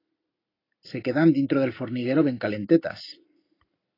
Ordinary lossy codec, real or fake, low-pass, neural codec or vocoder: AAC, 24 kbps; real; 5.4 kHz; none